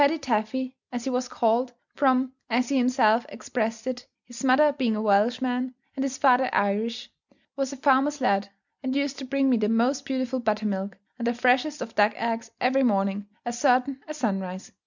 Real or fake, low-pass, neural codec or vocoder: real; 7.2 kHz; none